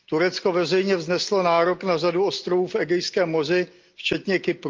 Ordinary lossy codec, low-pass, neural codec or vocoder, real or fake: Opus, 24 kbps; 7.2 kHz; none; real